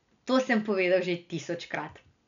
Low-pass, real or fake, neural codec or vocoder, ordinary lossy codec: 7.2 kHz; real; none; none